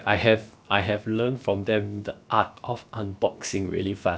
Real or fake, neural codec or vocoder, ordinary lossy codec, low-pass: fake; codec, 16 kHz, about 1 kbps, DyCAST, with the encoder's durations; none; none